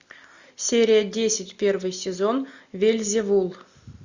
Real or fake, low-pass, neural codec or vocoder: real; 7.2 kHz; none